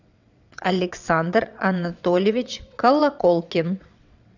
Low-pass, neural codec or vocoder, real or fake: 7.2 kHz; vocoder, 22.05 kHz, 80 mel bands, WaveNeXt; fake